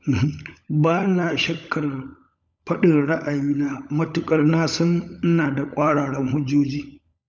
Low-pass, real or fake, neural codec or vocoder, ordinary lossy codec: none; fake; codec, 16 kHz, 16 kbps, FunCodec, trained on LibriTTS, 50 frames a second; none